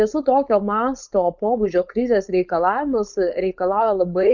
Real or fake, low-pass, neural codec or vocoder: fake; 7.2 kHz; codec, 16 kHz, 4.8 kbps, FACodec